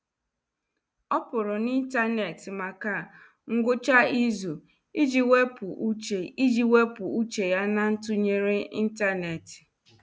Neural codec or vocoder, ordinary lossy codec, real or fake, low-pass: none; none; real; none